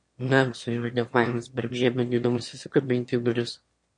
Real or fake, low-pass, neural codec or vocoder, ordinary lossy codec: fake; 9.9 kHz; autoencoder, 22.05 kHz, a latent of 192 numbers a frame, VITS, trained on one speaker; MP3, 48 kbps